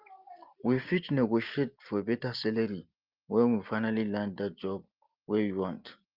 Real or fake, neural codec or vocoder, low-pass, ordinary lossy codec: real; none; 5.4 kHz; Opus, 32 kbps